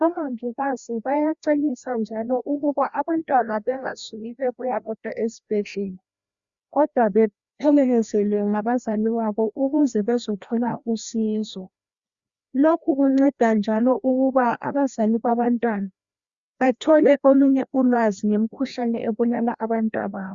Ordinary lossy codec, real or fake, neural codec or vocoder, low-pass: Opus, 64 kbps; fake; codec, 16 kHz, 1 kbps, FreqCodec, larger model; 7.2 kHz